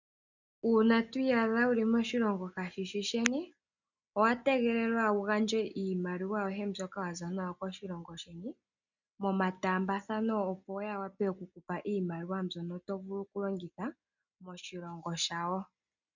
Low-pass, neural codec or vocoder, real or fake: 7.2 kHz; none; real